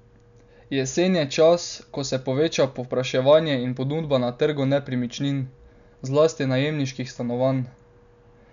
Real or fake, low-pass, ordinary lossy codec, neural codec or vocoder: real; 7.2 kHz; none; none